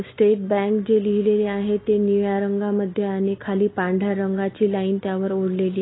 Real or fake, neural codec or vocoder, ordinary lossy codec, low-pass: real; none; AAC, 16 kbps; 7.2 kHz